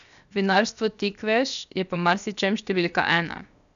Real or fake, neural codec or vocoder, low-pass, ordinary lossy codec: fake; codec, 16 kHz, 0.7 kbps, FocalCodec; 7.2 kHz; none